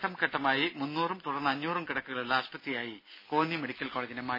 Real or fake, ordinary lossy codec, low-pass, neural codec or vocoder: real; MP3, 24 kbps; 5.4 kHz; none